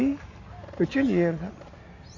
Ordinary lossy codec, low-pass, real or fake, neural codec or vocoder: none; 7.2 kHz; real; none